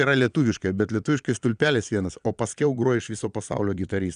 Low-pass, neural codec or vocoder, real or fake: 9.9 kHz; vocoder, 22.05 kHz, 80 mel bands, Vocos; fake